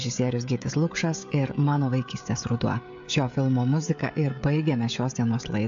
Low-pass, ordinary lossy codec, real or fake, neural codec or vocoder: 7.2 kHz; AAC, 64 kbps; fake; codec, 16 kHz, 16 kbps, FreqCodec, smaller model